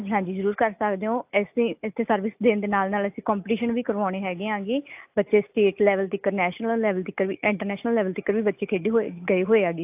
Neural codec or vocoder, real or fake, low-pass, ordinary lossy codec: none; real; 3.6 kHz; AAC, 32 kbps